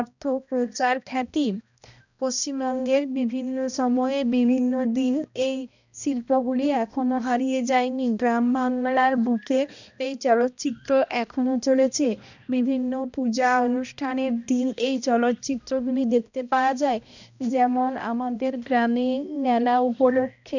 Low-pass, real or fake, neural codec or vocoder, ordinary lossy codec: 7.2 kHz; fake; codec, 16 kHz, 1 kbps, X-Codec, HuBERT features, trained on balanced general audio; none